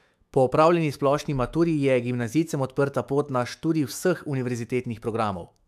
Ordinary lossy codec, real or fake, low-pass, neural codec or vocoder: AAC, 96 kbps; fake; 14.4 kHz; autoencoder, 48 kHz, 128 numbers a frame, DAC-VAE, trained on Japanese speech